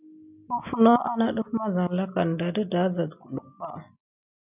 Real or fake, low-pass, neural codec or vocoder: real; 3.6 kHz; none